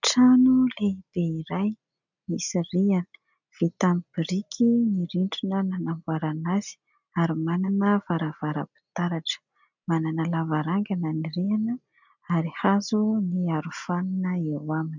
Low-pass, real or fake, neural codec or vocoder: 7.2 kHz; real; none